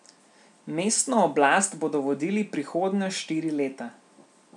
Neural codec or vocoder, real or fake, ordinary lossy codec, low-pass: none; real; none; 10.8 kHz